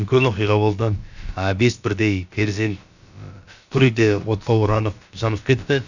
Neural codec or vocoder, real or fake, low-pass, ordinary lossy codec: codec, 16 kHz, about 1 kbps, DyCAST, with the encoder's durations; fake; 7.2 kHz; none